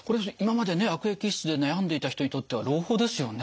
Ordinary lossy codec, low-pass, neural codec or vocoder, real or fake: none; none; none; real